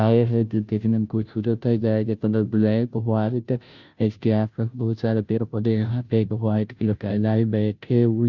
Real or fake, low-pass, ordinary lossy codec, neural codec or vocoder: fake; 7.2 kHz; Opus, 64 kbps; codec, 16 kHz, 0.5 kbps, FunCodec, trained on Chinese and English, 25 frames a second